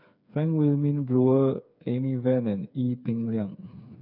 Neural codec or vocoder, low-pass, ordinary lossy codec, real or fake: codec, 16 kHz, 4 kbps, FreqCodec, smaller model; 5.4 kHz; none; fake